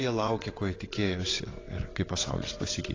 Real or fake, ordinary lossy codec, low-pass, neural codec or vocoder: fake; AAC, 32 kbps; 7.2 kHz; vocoder, 22.05 kHz, 80 mel bands, WaveNeXt